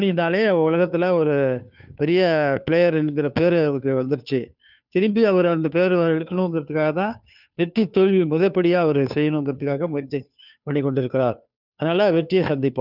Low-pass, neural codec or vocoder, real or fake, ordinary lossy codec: 5.4 kHz; codec, 16 kHz, 2 kbps, FunCodec, trained on Chinese and English, 25 frames a second; fake; none